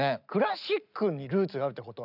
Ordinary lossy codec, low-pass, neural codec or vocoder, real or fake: none; 5.4 kHz; none; real